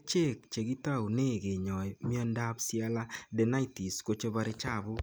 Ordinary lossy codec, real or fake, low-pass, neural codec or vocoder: none; real; none; none